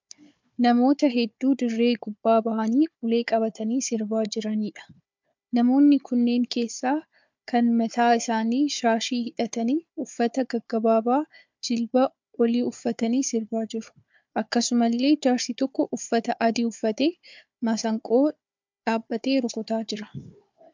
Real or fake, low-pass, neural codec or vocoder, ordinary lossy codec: fake; 7.2 kHz; codec, 16 kHz, 4 kbps, FunCodec, trained on Chinese and English, 50 frames a second; MP3, 64 kbps